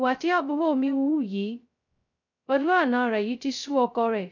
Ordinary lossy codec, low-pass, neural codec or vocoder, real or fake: none; 7.2 kHz; codec, 16 kHz, 0.2 kbps, FocalCodec; fake